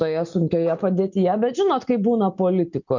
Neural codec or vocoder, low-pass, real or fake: none; 7.2 kHz; real